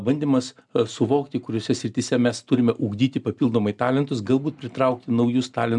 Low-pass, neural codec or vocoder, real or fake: 10.8 kHz; none; real